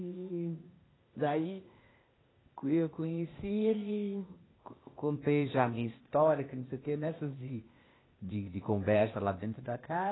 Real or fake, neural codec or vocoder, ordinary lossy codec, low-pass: fake; codec, 16 kHz, 0.8 kbps, ZipCodec; AAC, 16 kbps; 7.2 kHz